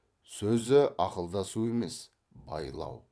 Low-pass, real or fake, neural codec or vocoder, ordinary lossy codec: none; fake; vocoder, 22.05 kHz, 80 mel bands, WaveNeXt; none